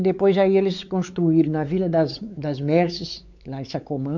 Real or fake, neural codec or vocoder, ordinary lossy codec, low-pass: fake; codec, 16 kHz, 4 kbps, X-Codec, WavLM features, trained on Multilingual LibriSpeech; none; 7.2 kHz